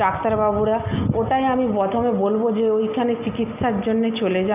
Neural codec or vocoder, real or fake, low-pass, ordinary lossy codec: none; real; 3.6 kHz; none